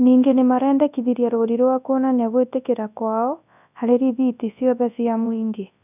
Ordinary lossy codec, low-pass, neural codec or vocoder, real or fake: none; 3.6 kHz; codec, 24 kHz, 0.9 kbps, WavTokenizer, large speech release; fake